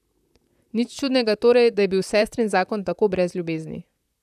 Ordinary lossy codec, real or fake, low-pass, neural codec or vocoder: none; fake; 14.4 kHz; vocoder, 44.1 kHz, 128 mel bands, Pupu-Vocoder